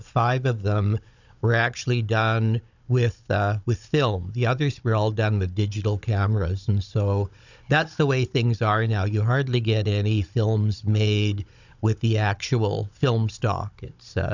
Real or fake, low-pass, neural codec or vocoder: fake; 7.2 kHz; codec, 16 kHz, 16 kbps, FunCodec, trained on Chinese and English, 50 frames a second